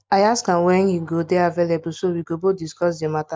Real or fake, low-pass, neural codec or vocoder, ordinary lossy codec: real; none; none; none